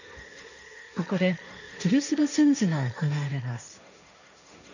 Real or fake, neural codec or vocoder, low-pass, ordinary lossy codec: fake; codec, 16 kHz, 1.1 kbps, Voila-Tokenizer; 7.2 kHz; none